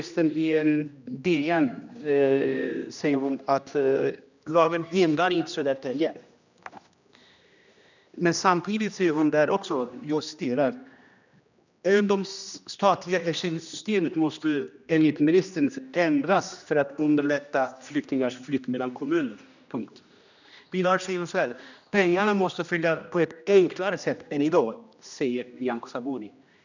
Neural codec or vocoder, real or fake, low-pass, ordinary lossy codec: codec, 16 kHz, 1 kbps, X-Codec, HuBERT features, trained on general audio; fake; 7.2 kHz; none